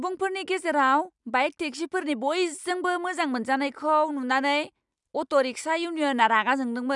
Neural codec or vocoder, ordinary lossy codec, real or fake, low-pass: none; none; real; 10.8 kHz